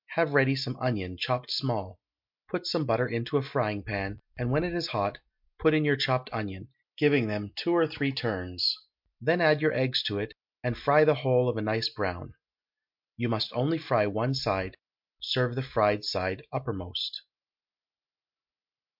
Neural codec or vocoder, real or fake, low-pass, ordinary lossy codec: none; real; 5.4 kHz; MP3, 48 kbps